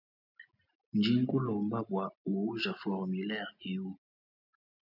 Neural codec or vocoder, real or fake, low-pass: none; real; 5.4 kHz